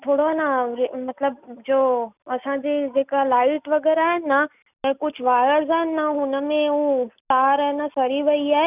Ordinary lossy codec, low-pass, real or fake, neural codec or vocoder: none; 3.6 kHz; real; none